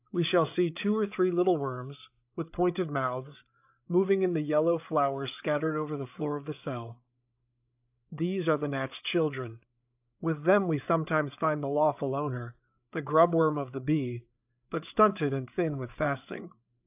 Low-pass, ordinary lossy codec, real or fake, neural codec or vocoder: 3.6 kHz; AAC, 32 kbps; fake; codec, 16 kHz, 8 kbps, FreqCodec, larger model